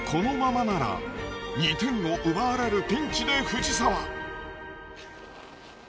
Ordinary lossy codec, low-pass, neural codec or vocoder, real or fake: none; none; none; real